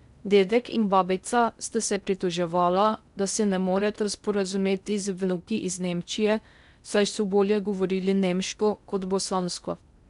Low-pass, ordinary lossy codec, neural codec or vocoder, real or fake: 10.8 kHz; none; codec, 16 kHz in and 24 kHz out, 0.6 kbps, FocalCodec, streaming, 2048 codes; fake